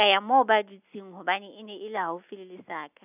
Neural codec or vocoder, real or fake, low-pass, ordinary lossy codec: none; real; 3.6 kHz; none